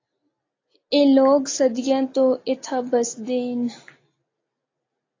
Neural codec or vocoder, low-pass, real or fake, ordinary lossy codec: none; 7.2 kHz; real; AAC, 32 kbps